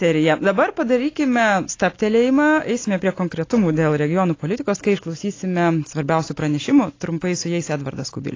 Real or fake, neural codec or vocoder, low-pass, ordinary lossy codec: real; none; 7.2 kHz; AAC, 32 kbps